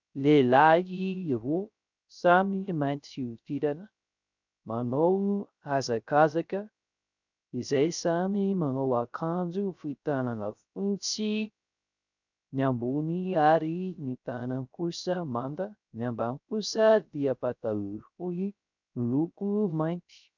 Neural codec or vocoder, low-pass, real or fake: codec, 16 kHz, 0.3 kbps, FocalCodec; 7.2 kHz; fake